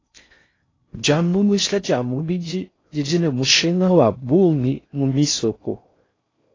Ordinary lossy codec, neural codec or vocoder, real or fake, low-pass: AAC, 32 kbps; codec, 16 kHz in and 24 kHz out, 0.6 kbps, FocalCodec, streaming, 4096 codes; fake; 7.2 kHz